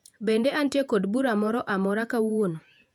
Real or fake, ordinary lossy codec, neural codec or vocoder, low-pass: fake; none; vocoder, 48 kHz, 128 mel bands, Vocos; 19.8 kHz